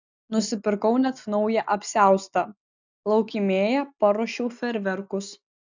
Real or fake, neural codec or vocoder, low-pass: real; none; 7.2 kHz